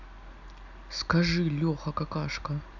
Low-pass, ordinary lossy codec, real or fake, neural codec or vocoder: 7.2 kHz; none; real; none